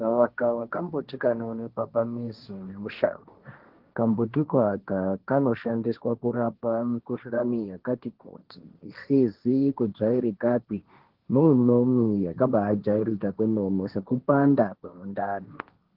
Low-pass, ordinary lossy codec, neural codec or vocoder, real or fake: 5.4 kHz; Opus, 16 kbps; codec, 16 kHz, 1.1 kbps, Voila-Tokenizer; fake